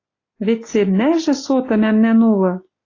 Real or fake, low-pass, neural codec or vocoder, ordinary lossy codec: real; 7.2 kHz; none; AAC, 32 kbps